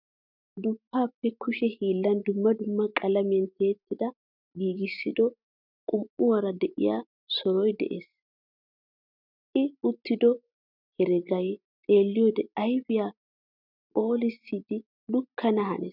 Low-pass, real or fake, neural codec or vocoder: 5.4 kHz; real; none